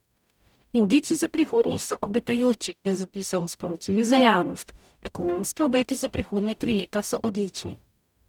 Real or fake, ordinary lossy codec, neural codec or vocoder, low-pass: fake; none; codec, 44.1 kHz, 0.9 kbps, DAC; 19.8 kHz